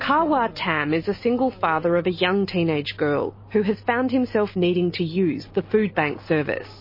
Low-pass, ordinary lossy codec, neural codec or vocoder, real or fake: 5.4 kHz; MP3, 24 kbps; none; real